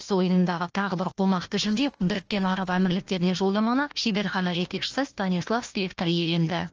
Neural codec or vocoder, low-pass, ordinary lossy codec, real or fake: codec, 16 kHz, 1 kbps, FunCodec, trained on Chinese and English, 50 frames a second; 7.2 kHz; Opus, 24 kbps; fake